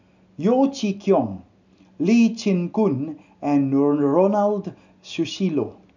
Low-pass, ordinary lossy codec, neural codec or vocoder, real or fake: 7.2 kHz; none; none; real